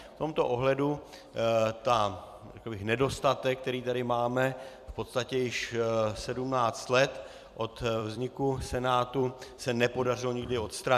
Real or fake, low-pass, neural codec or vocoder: fake; 14.4 kHz; vocoder, 44.1 kHz, 128 mel bands every 256 samples, BigVGAN v2